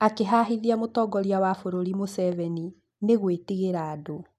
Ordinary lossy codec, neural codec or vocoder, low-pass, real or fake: none; none; 14.4 kHz; real